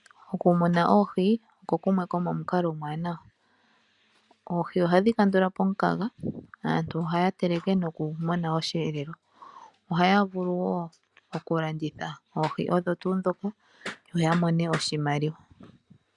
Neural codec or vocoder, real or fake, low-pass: none; real; 10.8 kHz